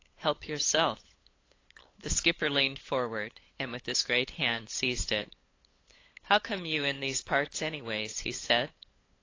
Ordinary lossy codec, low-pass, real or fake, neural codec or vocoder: AAC, 32 kbps; 7.2 kHz; fake; codec, 16 kHz, 8 kbps, FunCodec, trained on LibriTTS, 25 frames a second